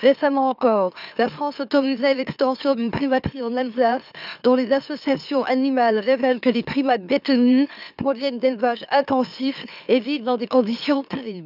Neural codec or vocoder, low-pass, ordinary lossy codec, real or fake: autoencoder, 44.1 kHz, a latent of 192 numbers a frame, MeloTTS; 5.4 kHz; none; fake